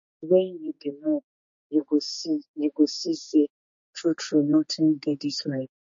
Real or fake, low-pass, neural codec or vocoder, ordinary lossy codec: fake; 7.2 kHz; codec, 16 kHz, 4 kbps, X-Codec, HuBERT features, trained on general audio; MP3, 32 kbps